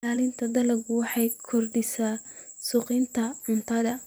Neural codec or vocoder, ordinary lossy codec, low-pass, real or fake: vocoder, 44.1 kHz, 128 mel bands every 512 samples, BigVGAN v2; none; none; fake